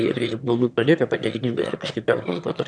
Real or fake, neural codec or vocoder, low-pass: fake; autoencoder, 22.05 kHz, a latent of 192 numbers a frame, VITS, trained on one speaker; 9.9 kHz